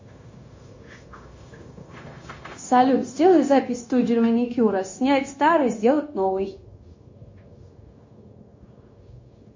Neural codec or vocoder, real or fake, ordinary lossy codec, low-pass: codec, 16 kHz, 0.9 kbps, LongCat-Audio-Codec; fake; MP3, 32 kbps; 7.2 kHz